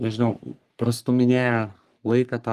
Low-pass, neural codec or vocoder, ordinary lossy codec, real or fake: 14.4 kHz; codec, 44.1 kHz, 3.4 kbps, Pupu-Codec; Opus, 32 kbps; fake